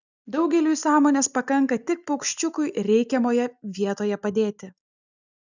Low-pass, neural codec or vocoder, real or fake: 7.2 kHz; none; real